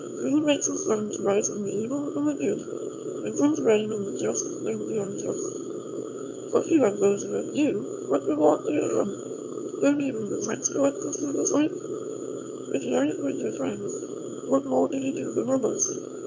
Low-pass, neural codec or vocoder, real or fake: 7.2 kHz; autoencoder, 22.05 kHz, a latent of 192 numbers a frame, VITS, trained on one speaker; fake